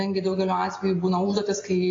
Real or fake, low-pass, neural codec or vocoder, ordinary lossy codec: real; 7.2 kHz; none; AAC, 32 kbps